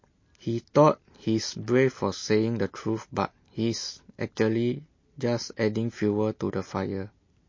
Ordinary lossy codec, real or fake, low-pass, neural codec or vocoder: MP3, 32 kbps; real; 7.2 kHz; none